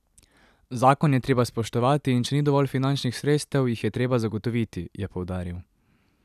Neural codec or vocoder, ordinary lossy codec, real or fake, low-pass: vocoder, 44.1 kHz, 128 mel bands every 256 samples, BigVGAN v2; none; fake; 14.4 kHz